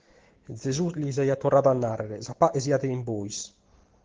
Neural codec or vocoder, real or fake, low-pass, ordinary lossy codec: none; real; 7.2 kHz; Opus, 16 kbps